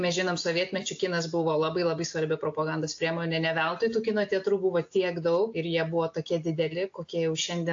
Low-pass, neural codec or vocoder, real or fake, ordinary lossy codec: 7.2 kHz; none; real; AAC, 48 kbps